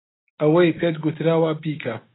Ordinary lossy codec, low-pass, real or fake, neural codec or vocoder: AAC, 16 kbps; 7.2 kHz; real; none